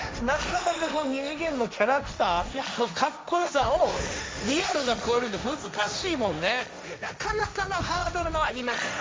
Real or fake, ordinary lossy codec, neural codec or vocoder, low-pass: fake; none; codec, 16 kHz, 1.1 kbps, Voila-Tokenizer; none